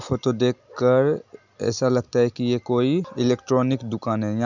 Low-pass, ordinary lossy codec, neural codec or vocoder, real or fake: 7.2 kHz; none; none; real